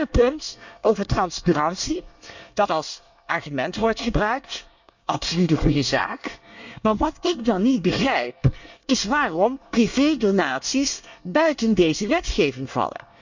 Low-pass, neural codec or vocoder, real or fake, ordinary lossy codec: 7.2 kHz; codec, 24 kHz, 1 kbps, SNAC; fake; none